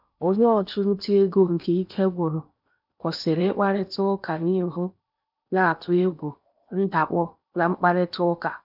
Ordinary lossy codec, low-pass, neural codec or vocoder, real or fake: none; 5.4 kHz; codec, 16 kHz in and 24 kHz out, 0.8 kbps, FocalCodec, streaming, 65536 codes; fake